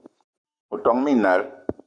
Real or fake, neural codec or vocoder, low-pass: fake; codec, 44.1 kHz, 7.8 kbps, Pupu-Codec; 9.9 kHz